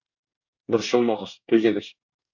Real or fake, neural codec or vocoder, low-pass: fake; codec, 24 kHz, 1 kbps, SNAC; 7.2 kHz